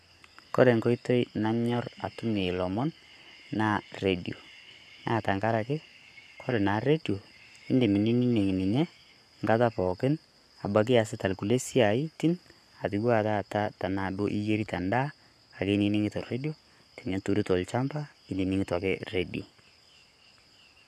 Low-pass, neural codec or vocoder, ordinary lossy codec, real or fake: 14.4 kHz; codec, 44.1 kHz, 7.8 kbps, Pupu-Codec; none; fake